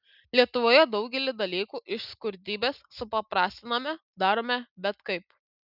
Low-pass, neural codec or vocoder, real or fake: 5.4 kHz; none; real